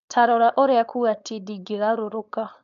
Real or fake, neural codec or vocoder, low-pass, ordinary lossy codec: fake; codec, 16 kHz, 4.8 kbps, FACodec; 7.2 kHz; none